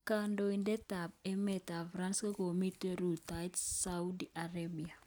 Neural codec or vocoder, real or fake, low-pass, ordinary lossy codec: none; real; none; none